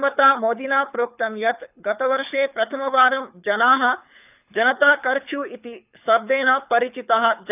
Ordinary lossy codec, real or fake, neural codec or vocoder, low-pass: none; fake; codec, 24 kHz, 6 kbps, HILCodec; 3.6 kHz